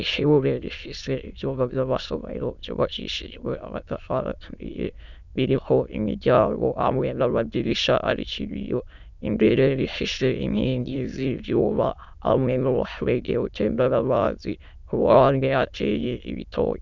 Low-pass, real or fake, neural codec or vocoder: 7.2 kHz; fake; autoencoder, 22.05 kHz, a latent of 192 numbers a frame, VITS, trained on many speakers